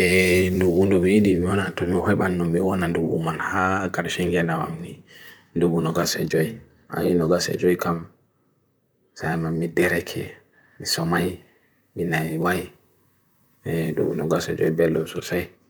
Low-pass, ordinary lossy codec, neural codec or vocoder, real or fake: none; none; vocoder, 44.1 kHz, 128 mel bands, Pupu-Vocoder; fake